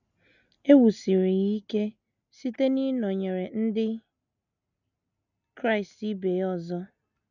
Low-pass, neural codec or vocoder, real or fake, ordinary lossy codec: 7.2 kHz; none; real; none